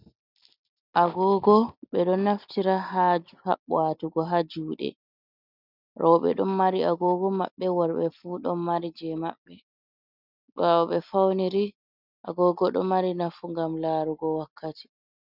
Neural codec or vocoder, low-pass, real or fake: none; 5.4 kHz; real